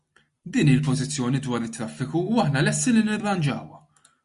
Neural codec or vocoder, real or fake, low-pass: none; real; 10.8 kHz